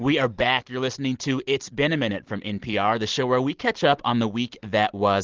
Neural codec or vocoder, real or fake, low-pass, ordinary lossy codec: none; real; 7.2 kHz; Opus, 16 kbps